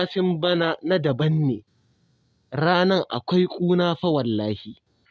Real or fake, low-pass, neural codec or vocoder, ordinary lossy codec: real; none; none; none